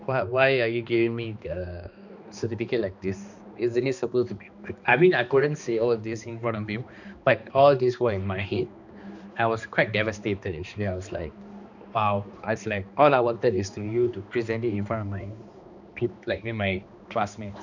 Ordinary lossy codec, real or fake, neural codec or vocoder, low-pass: none; fake; codec, 16 kHz, 2 kbps, X-Codec, HuBERT features, trained on balanced general audio; 7.2 kHz